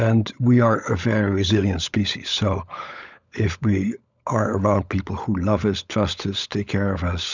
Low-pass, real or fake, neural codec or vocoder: 7.2 kHz; fake; codec, 16 kHz, 16 kbps, FunCodec, trained on LibriTTS, 50 frames a second